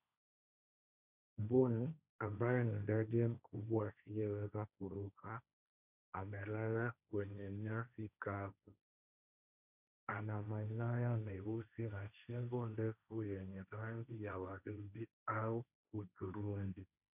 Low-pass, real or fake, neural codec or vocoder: 3.6 kHz; fake; codec, 16 kHz, 1.1 kbps, Voila-Tokenizer